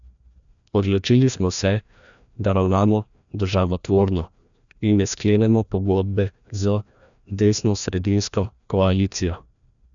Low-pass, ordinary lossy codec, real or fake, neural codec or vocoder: 7.2 kHz; none; fake; codec, 16 kHz, 1 kbps, FreqCodec, larger model